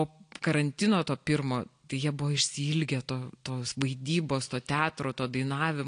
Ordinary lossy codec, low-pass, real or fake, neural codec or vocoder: AAC, 48 kbps; 9.9 kHz; real; none